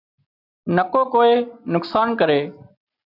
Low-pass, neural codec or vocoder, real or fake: 5.4 kHz; none; real